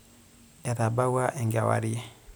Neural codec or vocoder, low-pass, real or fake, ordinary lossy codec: none; none; real; none